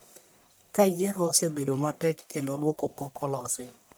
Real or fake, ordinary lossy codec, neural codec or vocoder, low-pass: fake; none; codec, 44.1 kHz, 1.7 kbps, Pupu-Codec; none